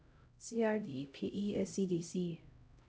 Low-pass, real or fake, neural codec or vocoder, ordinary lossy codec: none; fake; codec, 16 kHz, 0.5 kbps, X-Codec, HuBERT features, trained on LibriSpeech; none